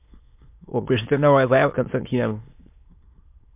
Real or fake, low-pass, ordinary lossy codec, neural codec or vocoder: fake; 3.6 kHz; MP3, 32 kbps; autoencoder, 22.05 kHz, a latent of 192 numbers a frame, VITS, trained on many speakers